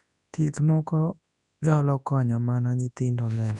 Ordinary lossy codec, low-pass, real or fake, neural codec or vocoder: none; 10.8 kHz; fake; codec, 24 kHz, 0.9 kbps, WavTokenizer, large speech release